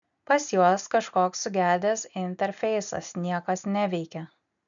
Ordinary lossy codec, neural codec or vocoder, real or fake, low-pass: MP3, 96 kbps; none; real; 7.2 kHz